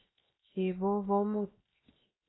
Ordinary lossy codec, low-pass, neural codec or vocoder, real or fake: AAC, 16 kbps; 7.2 kHz; codec, 16 kHz, 0.7 kbps, FocalCodec; fake